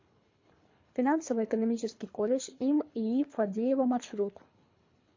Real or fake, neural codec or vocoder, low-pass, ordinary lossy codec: fake; codec, 24 kHz, 3 kbps, HILCodec; 7.2 kHz; MP3, 48 kbps